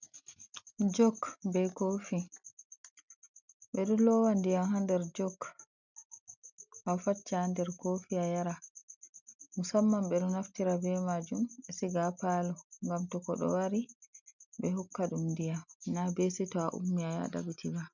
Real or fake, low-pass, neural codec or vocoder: real; 7.2 kHz; none